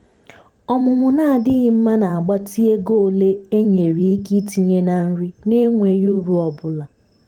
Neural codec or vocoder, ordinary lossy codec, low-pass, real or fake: vocoder, 44.1 kHz, 128 mel bands every 512 samples, BigVGAN v2; Opus, 24 kbps; 19.8 kHz; fake